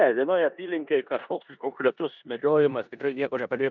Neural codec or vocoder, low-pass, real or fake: codec, 16 kHz in and 24 kHz out, 0.9 kbps, LongCat-Audio-Codec, four codebook decoder; 7.2 kHz; fake